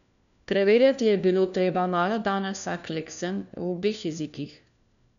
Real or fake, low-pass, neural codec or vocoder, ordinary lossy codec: fake; 7.2 kHz; codec, 16 kHz, 1 kbps, FunCodec, trained on LibriTTS, 50 frames a second; none